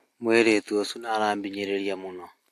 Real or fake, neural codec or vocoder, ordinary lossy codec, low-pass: real; none; AAC, 64 kbps; 14.4 kHz